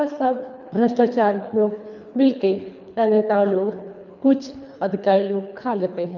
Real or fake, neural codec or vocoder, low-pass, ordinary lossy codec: fake; codec, 24 kHz, 3 kbps, HILCodec; 7.2 kHz; none